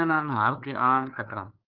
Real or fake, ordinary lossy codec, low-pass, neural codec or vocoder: fake; Opus, 32 kbps; 5.4 kHz; codec, 16 kHz, 2 kbps, FunCodec, trained on LibriTTS, 25 frames a second